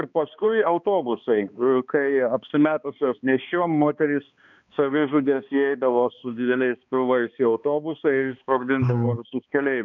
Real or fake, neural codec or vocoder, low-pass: fake; codec, 16 kHz, 2 kbps, X-Codec, HuBERT features, trained on balanced general audio; 7.2 kHz